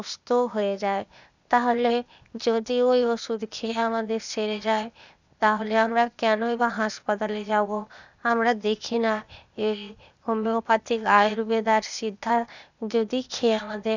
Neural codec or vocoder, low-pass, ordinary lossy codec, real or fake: codec, 16 kHz, 0.8 kbps, ZipCodec; 7.2 kHz; none; fake